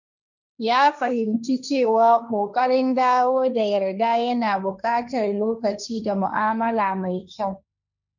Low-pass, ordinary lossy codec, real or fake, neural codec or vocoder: 7.2 kHz; none; fake; codec, 16 kHz, 1.1 kbps, Voila-Tokenizer